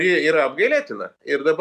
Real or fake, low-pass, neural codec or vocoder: real; 14.4 kHz; none